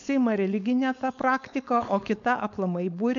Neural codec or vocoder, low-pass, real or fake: codec, 16 kHz, 4.8 kbps, FACodec; 7.2 kHz; fake